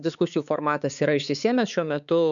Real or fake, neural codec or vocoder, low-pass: fake; codec, 16 kHz, 4 kbps, X-Codec, HuBERT features, trained on balanced general audio; 7.2 kHz